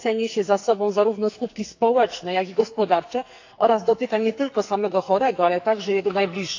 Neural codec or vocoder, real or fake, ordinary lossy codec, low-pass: codec, 44.1 kHz, 2.6 kbps, SNAC; fake; AAC, 48 kbps; 7.2 kHz